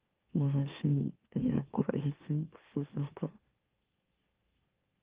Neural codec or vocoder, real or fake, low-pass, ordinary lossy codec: autoencoder, 44.1 kHz, a latent of 192 numbers a frame, MeloTTS; fake; 3.6 kHz; Opus, 32 kbps